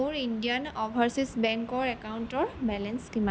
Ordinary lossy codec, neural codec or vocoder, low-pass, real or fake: none; none; none; real